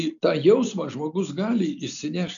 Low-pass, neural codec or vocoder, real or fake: 7.2 kHz; none; real